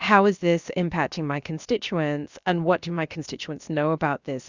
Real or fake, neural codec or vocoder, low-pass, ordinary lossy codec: fake; codec, 16 kHz, about 1 kbps, DyCAST, with the encoder's durations; 7.2 kHz; Opus, 64 kbps